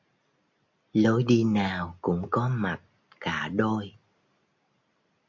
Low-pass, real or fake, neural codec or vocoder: 7.2 kHz; real; none